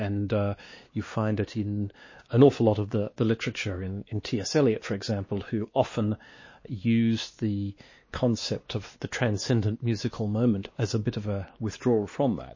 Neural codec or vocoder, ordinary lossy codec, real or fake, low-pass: codec, 16 kHz, 2 kbps, X-Codec, WavLM features, trained on Multilingual LibriSpeech; MP3, 32 kbps; fake; 7.2 kHz